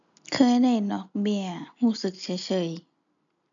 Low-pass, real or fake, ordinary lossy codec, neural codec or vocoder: 7.2 kHz; real; none; none